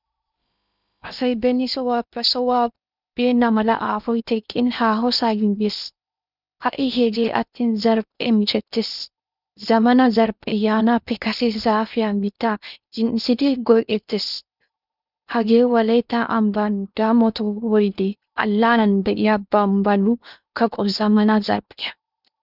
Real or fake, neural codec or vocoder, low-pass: fake; codec, 16 kHz in and 24 kHz out, 0.8 kbps, FocalCodec, streaming, 65536 codes; 5.4 kHz